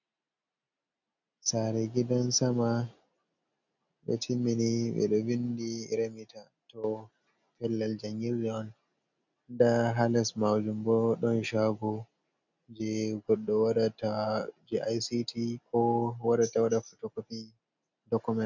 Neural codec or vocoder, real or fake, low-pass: none; real; 7.2 kHz